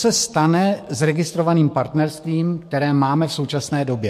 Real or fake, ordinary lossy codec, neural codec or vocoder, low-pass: fake; MP3, 64 kbps; codec, 44.1 kHz, 7.8 kbps, DAC; 14.4 kHz